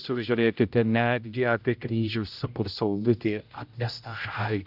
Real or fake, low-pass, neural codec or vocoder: fake; 5.4 kHz; codec, 16 kHz, 0.5 kbps, X-Codec, HuBERT features, trained on general audio